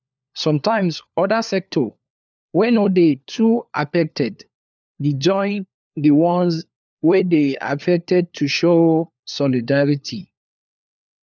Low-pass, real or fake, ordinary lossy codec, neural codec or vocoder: none; fake; none; codec, 16 kHz, 4 kbps, FunCodec, trained on LibriTTS, 50 frames a second